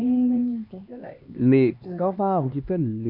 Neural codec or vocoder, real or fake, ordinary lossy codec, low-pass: codec, 16 kHz, 2 kbps, X-Codec, HuBERT features, trained on LibriSpeech; fake; none; 5.4 kHz